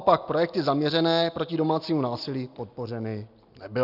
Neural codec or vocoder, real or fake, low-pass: none; real; 5.4 kHz